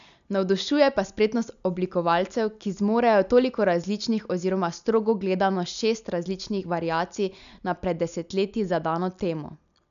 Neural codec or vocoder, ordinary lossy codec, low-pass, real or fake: none; none; 7.2 kHz; real